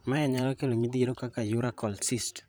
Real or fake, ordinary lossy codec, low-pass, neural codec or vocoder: fake; none; none; vocoder, 44.1 kHz, 128 mel bands, Pupu-Vocoder